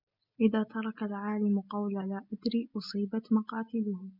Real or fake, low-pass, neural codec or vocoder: real; 5.4 kHz; none